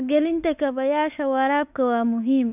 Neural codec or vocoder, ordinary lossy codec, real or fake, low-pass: autoencoder, 48 kHz, 32 numbers a frame, DAC-VAE, trained on Japanese speech; none; fake; 3.6 kHz